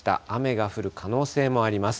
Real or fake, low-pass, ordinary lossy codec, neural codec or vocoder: real; none; none; none